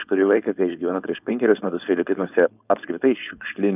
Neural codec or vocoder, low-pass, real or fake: codec, 16 kHz, 4.8 kbps, FACodec; 3.6 kHz; fake